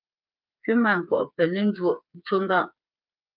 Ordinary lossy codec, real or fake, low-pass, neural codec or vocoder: Opus, 24 kbps; fake; 5.4 kHz; codec, 16 kHz, 4 kbps, FreqCodec, smaller model